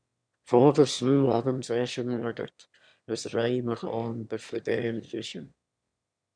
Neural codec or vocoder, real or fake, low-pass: autoencoder, 22.05 kHz, a latent of 192 numbers a frame, VITS, trained on one speaker; fake; 9.9 kHz